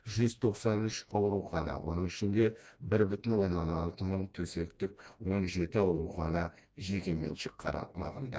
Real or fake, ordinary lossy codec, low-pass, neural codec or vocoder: fake; none; none; codec, 16 kHz, 1 kbps, FreqCodec, smaller model